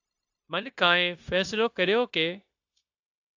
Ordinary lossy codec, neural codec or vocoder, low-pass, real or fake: AAC, 48 kbps; codec, 16 kHz, 0.9 kbps, LongCat-Audio-Codec; 7.2 kHz; fake